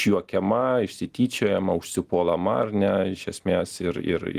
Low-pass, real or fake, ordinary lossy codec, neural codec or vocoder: 14.4 kHz; real; Opus, 24 kbps; none